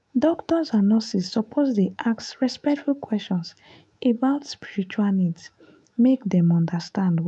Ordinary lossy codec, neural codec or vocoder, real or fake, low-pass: none; autoencoder, 48 kHz, 128 numbers a frame, DAC-VAE, trained on Japanese speech; fake; 10.8 kHz